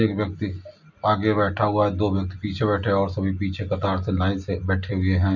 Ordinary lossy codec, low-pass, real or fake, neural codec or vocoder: none; 7.2 kHz; real; none